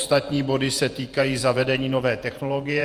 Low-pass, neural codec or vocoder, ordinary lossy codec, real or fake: 14.4 kHz; vocoder, 48 kHz, 128 mel bands, Vocos; Opus, 32 kbps; fake